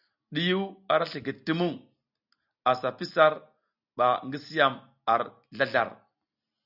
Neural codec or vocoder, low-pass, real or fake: none; 5.4 kHz; real